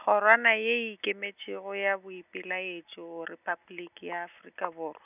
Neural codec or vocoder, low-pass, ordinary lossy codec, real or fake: none; 3.6 kHz; none; real